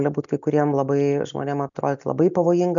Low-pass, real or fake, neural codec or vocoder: 7.2 kHz; real; none